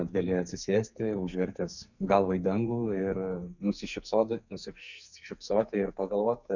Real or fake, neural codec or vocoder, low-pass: fake; codec, 44.1 kHz, 2.6 kbps, SNAC; 7.2 kHz